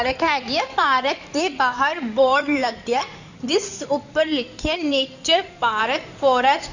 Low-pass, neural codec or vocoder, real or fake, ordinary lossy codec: 7.2 kHz; codec, 16 kHz in and 24 kHz out, 2.2 kbps, FireRedTTS-2 codec; fake; none